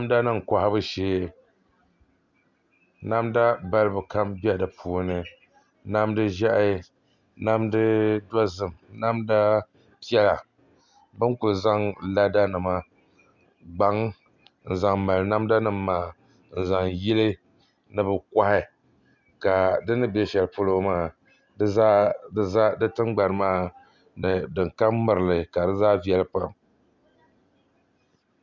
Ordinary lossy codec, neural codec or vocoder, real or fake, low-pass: Opus, 64 kbps; none; real; 7.2 kHz